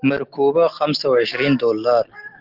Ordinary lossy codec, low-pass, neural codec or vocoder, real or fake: Opus, 16 kbps; 5.4 kHz; none; real